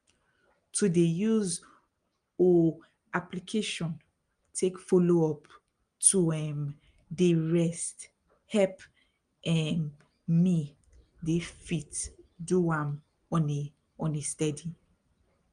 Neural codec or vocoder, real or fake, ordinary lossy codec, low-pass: none; real; Opus, 32 kbps; 9.9 kHz